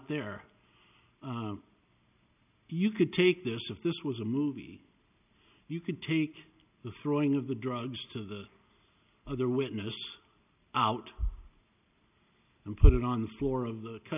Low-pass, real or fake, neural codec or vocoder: 3.6 kHz; real; none